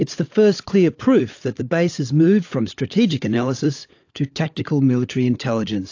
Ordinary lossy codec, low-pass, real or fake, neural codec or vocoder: AAC, 48 kbps; 7.2 kHz; fake; codec, 16 kHz, 16 kbps, FunCodec, trained on LibriTTS, 50 frames a second